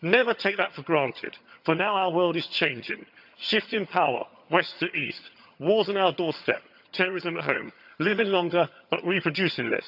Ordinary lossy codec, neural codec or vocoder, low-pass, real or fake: none; vocoder, 22.05 kHz, 80 mel bands, HiFi-GAN; 5.4 kHz; fake